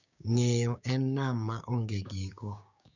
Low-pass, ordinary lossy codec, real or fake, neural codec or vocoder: 7.2 kHz; none; fake; codec, 16 kHz, 6 kbps, DAC